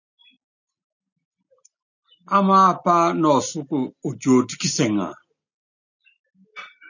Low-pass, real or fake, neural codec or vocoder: 7.2 kHz; real; none